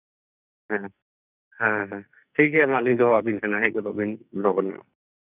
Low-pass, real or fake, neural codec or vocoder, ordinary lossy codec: 3.6 kHz; fake; codec, 44.1 kHz, 2.6 kbps, SNAC; none